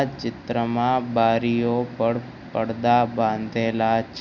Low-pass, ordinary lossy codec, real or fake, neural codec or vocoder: 7.2 kHz; none; real; none